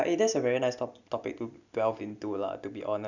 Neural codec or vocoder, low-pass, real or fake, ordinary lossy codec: none; 7.2 kHz; real; none